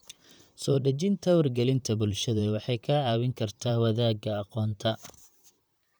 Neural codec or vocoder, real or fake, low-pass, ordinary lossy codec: vocoder, 44.1 kHz, 128 mel bands, Pupu-Vocoder; fake; none; none